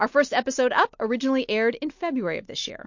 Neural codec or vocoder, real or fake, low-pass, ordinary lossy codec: none; real; 7.2 kHz; MP3, 48 kbps